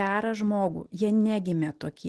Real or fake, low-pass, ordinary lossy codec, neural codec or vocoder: real; 10.8 kHz; Opus, 16 kbps; none